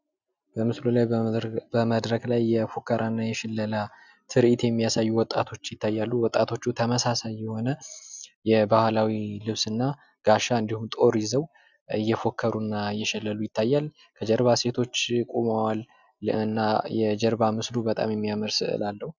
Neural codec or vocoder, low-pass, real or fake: none; 7.2 kHz; real